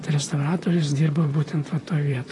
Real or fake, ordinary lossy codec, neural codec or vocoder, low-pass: fake; AAC, 32 kbps; vocoder, 44.1 kHz, 128 mel bands, Pupu-Vocoder; 10.8 kHz